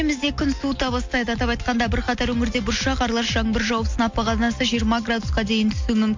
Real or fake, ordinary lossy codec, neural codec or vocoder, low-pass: real; MP3, 48 kbps; none; 7.2 kHz